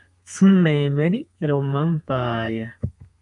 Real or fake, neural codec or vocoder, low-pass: fake; codec, 32 kHz, 1.9 kbps, SNAC; 10.8 kHz